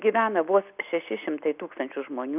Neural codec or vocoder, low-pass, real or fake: none; 3.6 kHz; real